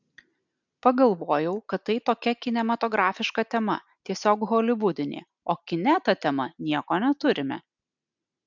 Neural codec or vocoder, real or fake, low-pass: none; real; 7.2 kHz